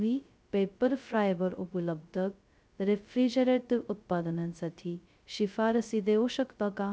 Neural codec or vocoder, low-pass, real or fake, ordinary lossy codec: codec, 16 kHz, 0.2 kbps, FocalCodec; none; fake; none